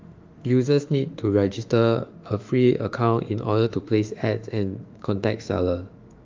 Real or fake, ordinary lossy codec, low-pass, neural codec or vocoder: fake; Opus, 32 kbps; 7.2 kHz; autoencoder, 48 kHz, 32 numbers a frame, DAC-VAE, trained on Japanese speech